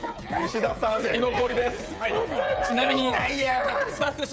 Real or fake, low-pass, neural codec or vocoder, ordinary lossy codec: fake; none; codec, 16 kHz, 16 kbps, FreqCodec, smaller model; none